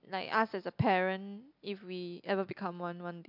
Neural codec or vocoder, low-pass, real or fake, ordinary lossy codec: none; 5.4 kHz; real; MP3, 48 kbps